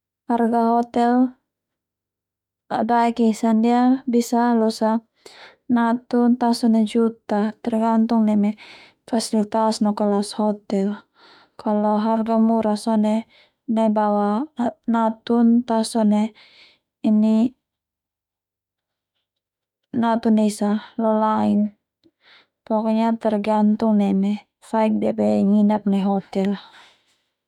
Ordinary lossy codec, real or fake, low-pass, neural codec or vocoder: none; fake; 19.8 kHz; autoencoder, 48 kHz, 32 numbers a frame, DAC-VAE, trained on Japanese speech